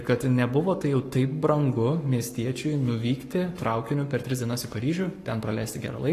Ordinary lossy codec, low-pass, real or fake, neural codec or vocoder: AAC, 48 kbps; 14.4 kHz; fake; codec, 44.1 kHz, 7.8 kbps, Pupu-Codec